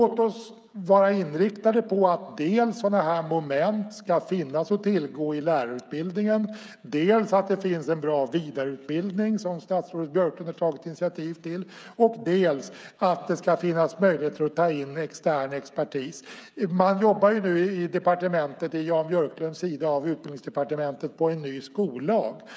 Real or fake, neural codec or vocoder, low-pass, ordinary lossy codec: fake; codec, 16 kHz, 16 kbps, FreqCodec, smaller model; none; none